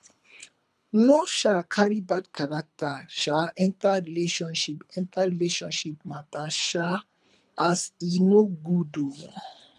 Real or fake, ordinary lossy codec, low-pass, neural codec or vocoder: fake; none; none; codec, 24 kHz, 3 kbps, HILCodec